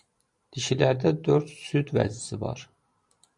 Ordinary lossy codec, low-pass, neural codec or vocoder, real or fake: MP3, 48 kbps; 10.8 kHz; none; real